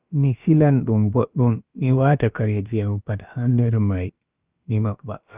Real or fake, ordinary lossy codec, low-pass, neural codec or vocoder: fake; Opus, 24 kbps; 3.6 kHz; codec, 16 kHz, about 1 kbps, DyCAST, with the encoder's durations